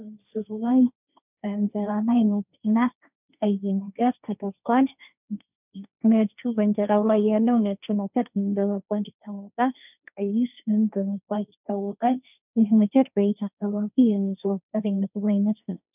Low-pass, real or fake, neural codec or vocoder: 3.6 kHz; fake; codec, 16 kHz, 1.1 kbps, Voila-Tokenizer